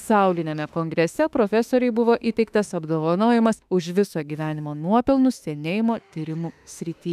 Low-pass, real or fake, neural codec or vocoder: 14.4 kHz; fake; autoencoder, 48 kHz, 32 numbers a frame, DAC-VAE, trained on Japanese speech